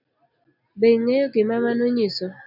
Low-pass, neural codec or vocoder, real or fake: 5.4 kHz; none; real